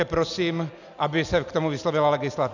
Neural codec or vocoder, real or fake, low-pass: none; real; 7.2 kHz